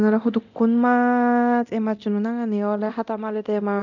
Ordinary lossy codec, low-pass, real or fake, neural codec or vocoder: none; 7.2 kHz; fake; codec, 24 kHz, 0.9 kbps, DualCodec